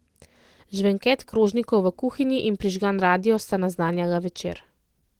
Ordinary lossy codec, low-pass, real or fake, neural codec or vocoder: Opus, 16 kbps; 19.8 kHz; real; none